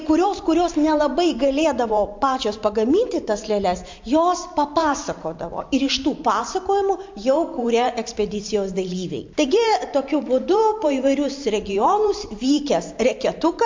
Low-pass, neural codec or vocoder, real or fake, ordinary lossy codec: 7.2 kHz; vocoder, 44.1 kHz, 128 mel bands every 512 samples, BigVGAN v2; fake; MP3, 48 kbps